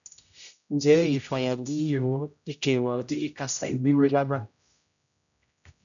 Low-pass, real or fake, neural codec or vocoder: 7.2 kHz; fake; codec, 16 kHz, 0.5 kbps, X-Codec, HuBERT features, trained on general audio